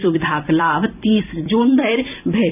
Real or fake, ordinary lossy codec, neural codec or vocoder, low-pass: fake; none; vocoder, 44.1 kHz, 128 mel bands every 256 samples, BigVGAN v2; 3.6 kHz